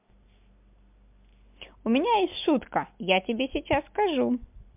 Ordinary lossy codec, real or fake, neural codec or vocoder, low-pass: MP3, 32 kbps; real; none; 3.6 kHz